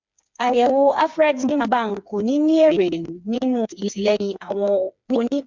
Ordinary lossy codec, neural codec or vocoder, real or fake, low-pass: MP3, 64 kbps; codec, 16 kHz, 4 kbps, FreqCodec, smaller model; fake; 7.2 kHz